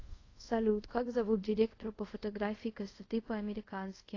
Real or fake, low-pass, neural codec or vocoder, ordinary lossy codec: fake; 7.2 kHz; codec, 24 kHz, 0.5 kbps, DualCodec; AAC, 32 kbps